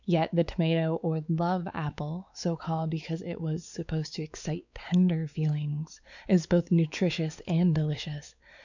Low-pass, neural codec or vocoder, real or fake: 7.2 kHz; codec, 16 kHz, 4 kbps, X-Codec, WavLM features, trained on Multilingual LibriSpeech; fake